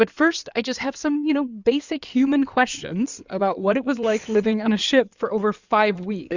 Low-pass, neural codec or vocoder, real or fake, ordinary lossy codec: 7.2 kHz; codec, 16 kHz in and 24 kHz out, 2.2 kbps, FireRedTTS-2 codec; fake; Opus, 64 kbps